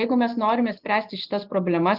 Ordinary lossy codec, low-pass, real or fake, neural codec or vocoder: Opus, 32 kbps; 5.4 kHz; real; none